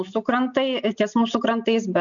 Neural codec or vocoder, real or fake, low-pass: none; real; 7.2 kHz